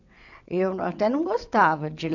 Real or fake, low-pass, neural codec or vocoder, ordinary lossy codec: fake; 7.2 kHz; vocoder, 22.05 kHz, 80 mel bands, WaveNeXt; AAC, 48 kbps